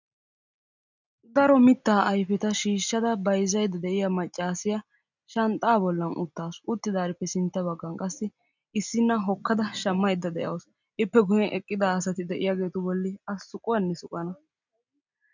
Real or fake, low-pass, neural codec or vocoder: real; 7.2 kHz; none